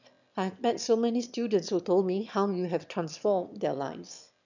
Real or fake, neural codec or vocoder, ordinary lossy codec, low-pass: fake; autoencoder, 22.05 kHz, a latent of 192 numbers a frame, VITS, trained on one speaker; none; 7.2 kHz